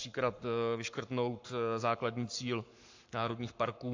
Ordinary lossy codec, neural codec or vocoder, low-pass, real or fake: AAC, 48 kbps; codec, 44.1 kHz, 7.8 kbps, Pupu-Codec; 7.2 kHz; fake